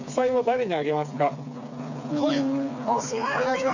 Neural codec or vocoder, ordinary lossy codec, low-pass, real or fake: codec, 16 kHz, 4 kbps, FreqCodec, smaller model; none; 7.2 kHz; fake